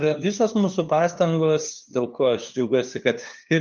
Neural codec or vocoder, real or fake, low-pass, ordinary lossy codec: codec, 16 kHz, 2 kbps, FunCodec, trained on LibriTTS, 25 frames a second; fake; 7.2 kHz; Opus, 32 kbps